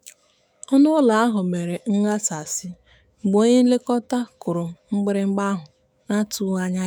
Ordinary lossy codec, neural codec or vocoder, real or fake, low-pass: none; autoencoder, 48 kHz, 128 numbers a frame, DAC-VAE, trained on Japanese speech; fake; none